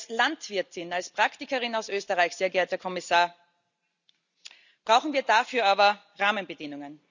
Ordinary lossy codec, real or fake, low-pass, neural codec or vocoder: none; real; 7.2 kHz; none